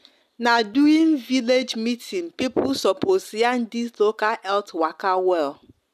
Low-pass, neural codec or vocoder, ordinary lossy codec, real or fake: 14.4 kHz; none; none; real